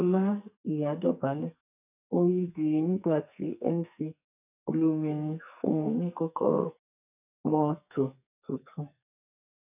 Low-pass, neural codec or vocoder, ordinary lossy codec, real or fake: 3.6 kHz; codec, 44.1 kHz, 2.6 kbps, SNAC; none; fake